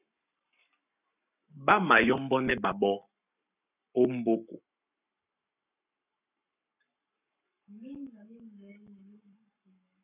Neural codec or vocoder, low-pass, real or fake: codec, 44.1 kHz, 7.8 kbps, Pupu-Codec; 3.6 kHz; fake